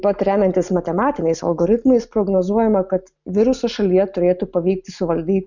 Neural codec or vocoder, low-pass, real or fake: none; 7.2 kHz; real